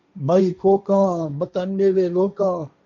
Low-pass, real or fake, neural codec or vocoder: 7.2 kHz; fake; codec, 16 kHz, 1.1 kbps, Voila-Tokenizer